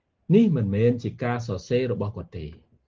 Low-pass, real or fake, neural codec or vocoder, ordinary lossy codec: 7.2 kHz; real; none; Opus, 16 kbps